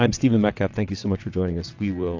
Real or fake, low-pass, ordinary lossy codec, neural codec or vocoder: fake; 7.2 kHz; AAC, 48 kbps; vocoder, 22.05 kHz, 80 mel bands, WaveNeXt